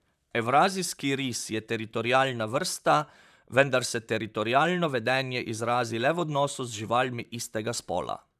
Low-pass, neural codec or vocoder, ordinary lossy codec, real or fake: 14.4 kHz; vocoder, 44.1 kHz, 128 mel bands, Pupu-Vocoder; none; fake